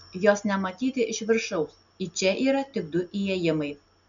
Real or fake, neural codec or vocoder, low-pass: real; none; 7.2 kHz